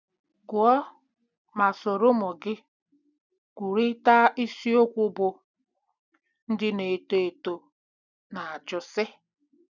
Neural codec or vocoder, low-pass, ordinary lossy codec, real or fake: none; 7.2 kHz; none; real